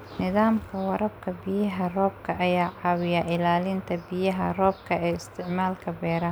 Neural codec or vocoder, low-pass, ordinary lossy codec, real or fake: none; none; none; real